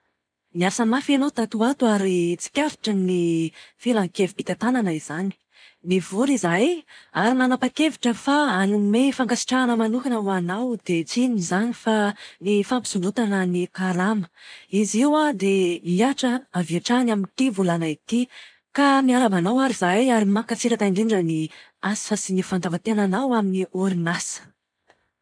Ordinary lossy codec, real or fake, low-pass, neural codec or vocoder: none; real; 9.9 kHz; none